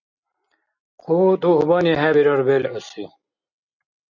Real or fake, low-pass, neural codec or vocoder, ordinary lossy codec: real; 7.2 kHz; none; MP3, 48 kbps